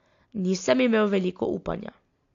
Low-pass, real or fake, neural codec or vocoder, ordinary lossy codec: 7.2 kHz; real; none; AAC, 48 kbps